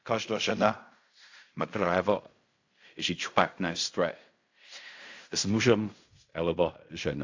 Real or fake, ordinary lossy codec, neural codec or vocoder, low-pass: fake; AAC, 48 kbps; codec, 16 kHz in and 24 kHz out, 0.4 kbps, LongCat-Audio-Codec, fine tuned four codebook decoder; 7.2 kHz